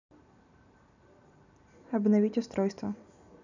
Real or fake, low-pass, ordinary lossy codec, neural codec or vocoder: real; 7.2 kHz; none; none